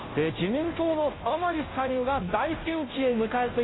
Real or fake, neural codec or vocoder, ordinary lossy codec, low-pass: fake; codec, 16 kHz, 0.5 kbps, FunCodec, trained on Chinese and English, 25 frames a second; AAC, 16 kbps; 7.2 kHz